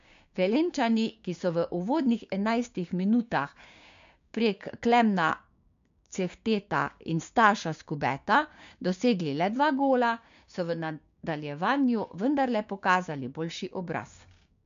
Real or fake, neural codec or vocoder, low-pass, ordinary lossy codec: fake; codec, 16 kHz, 6 kbps, DAC; 7.2 kHz; MP3, 64 kbps